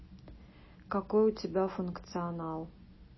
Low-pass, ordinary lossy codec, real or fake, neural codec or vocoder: 7.2 kHz; MP3, 24 kbps; real; none